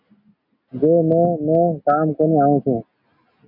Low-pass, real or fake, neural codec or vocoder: 5.4 kHz; real; none